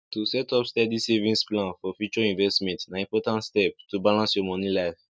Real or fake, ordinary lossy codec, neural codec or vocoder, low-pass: real; none; none; none